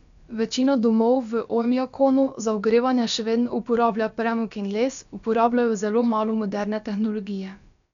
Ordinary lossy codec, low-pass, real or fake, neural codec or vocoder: none; 7.2 kHz; fake; codec, 16 kHz, about 1 kbps, DyCAST, with the encoder's durations